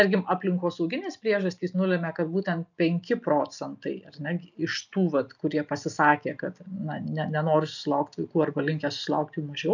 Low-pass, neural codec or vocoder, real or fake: 7.2 kHz; none; real